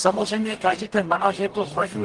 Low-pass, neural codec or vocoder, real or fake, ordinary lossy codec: 10.8 kHz; codec, 44.1 kHz, 0.9 kbps, DAC; fake; Opus, 24 kbps